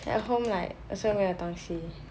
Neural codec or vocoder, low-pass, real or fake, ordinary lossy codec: none; none; real; none